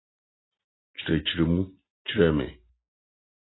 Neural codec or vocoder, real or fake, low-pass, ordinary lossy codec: none; real; 7.2 kHz; AAC, 16 kbps